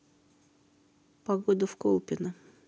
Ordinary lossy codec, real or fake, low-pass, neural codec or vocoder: none; real; none; none